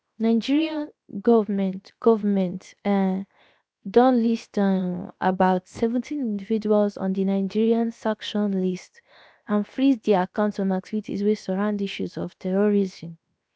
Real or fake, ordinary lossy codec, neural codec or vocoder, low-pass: fake; none; codec, 16 kHz, 0.7 kbps, FocalCodec; none